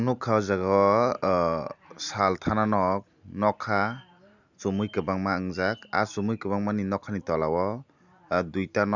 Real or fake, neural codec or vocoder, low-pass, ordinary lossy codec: real; none; 7.2 kHz; none